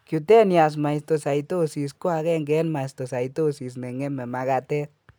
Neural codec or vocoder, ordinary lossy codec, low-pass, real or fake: none; none; none; real